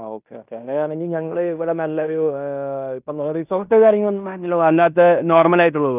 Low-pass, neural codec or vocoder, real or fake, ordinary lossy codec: 3.6 kHz; codec, 16 kHz in and 24 kHz out, 0.9 kbps, LongCat-Audio-Codec, fine tuned four codebook decoder; fake; none